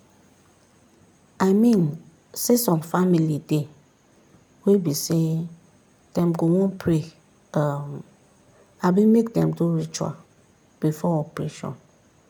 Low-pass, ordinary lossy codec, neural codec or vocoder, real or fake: 19.8 kHz; none; none; real